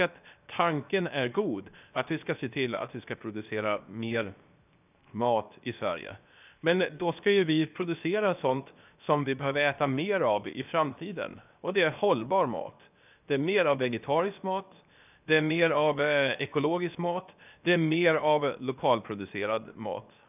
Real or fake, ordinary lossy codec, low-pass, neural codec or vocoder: fake; none; 3.6 kHz; codec, 16 kHz, 0.7 kbps, FocalCodec